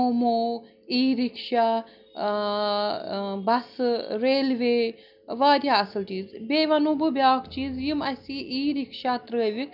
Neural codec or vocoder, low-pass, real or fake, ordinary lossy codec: none; 5.4 kHz; real; none